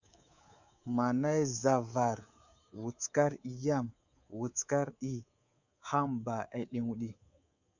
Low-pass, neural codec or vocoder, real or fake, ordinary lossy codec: 7.2 kHz; codec, 24 kHz, 3.1 kbps, DualCodec; fake; Opus, 64 kbps